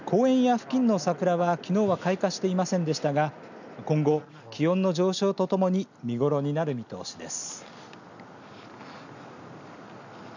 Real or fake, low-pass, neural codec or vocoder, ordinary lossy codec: real; 7.2 kHz; none; none